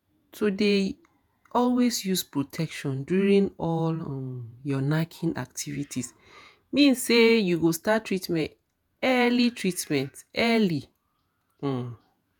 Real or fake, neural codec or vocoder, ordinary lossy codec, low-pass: fake; vocoder, 48 kHz, 128 mel bands, Vocos; none; none